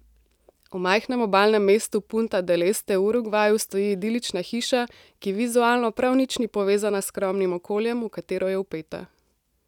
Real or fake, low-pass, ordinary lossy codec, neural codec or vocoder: real; 19.8 kHz; none; none